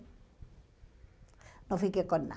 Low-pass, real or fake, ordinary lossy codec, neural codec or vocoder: none; real; none; none